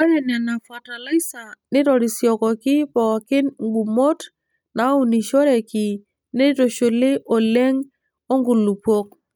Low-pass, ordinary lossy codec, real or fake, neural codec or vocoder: none; none; real; none